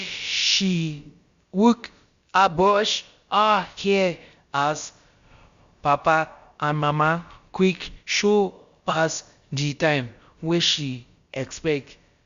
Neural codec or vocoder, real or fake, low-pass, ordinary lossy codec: codec, 16 kHz, about 1 kbps, DyCAST, with the encoder's durations; fake; 7.2 kHz; Opus, 64 kbps